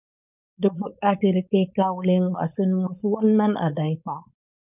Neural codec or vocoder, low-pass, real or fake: codec, 16 kHz, 4.8 kbps, FACodec; 3.6 kHz; fake